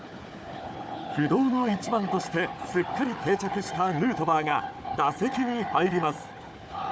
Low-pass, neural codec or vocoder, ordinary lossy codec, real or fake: none; codec, 16 kHz, 4 kbps, FunCodec, trained on Chinese and English, 50 frames a second; none; fake